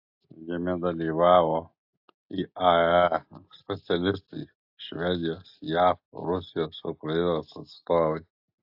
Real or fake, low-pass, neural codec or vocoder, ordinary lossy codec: real; 5.4 kHz; none; AAC, 48 kbps